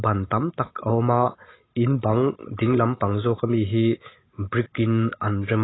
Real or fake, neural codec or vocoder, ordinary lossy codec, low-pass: real; none; AAC, 16 kbps; 7.2 kHz